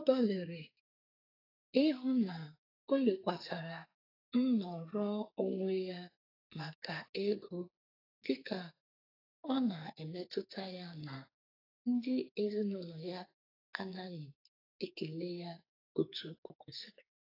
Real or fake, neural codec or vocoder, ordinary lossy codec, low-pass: fake; codec, 44.1 kHz, 2.6 kbps, SNAC; AAC, 24 kbps; 5.4 kHz